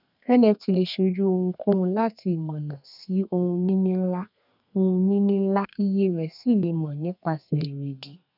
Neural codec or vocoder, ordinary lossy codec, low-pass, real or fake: codec, 32 kHz, 1.9 kbps, SNAC; none; 5.4 kHz; fake